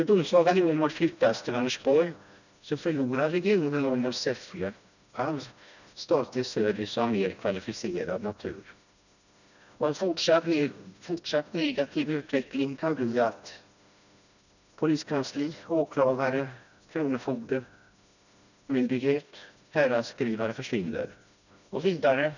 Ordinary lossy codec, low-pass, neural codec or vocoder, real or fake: none; 7.2 kHz; codec, 16 kHz, 1 kbps, FreqCodec, smaller model; fake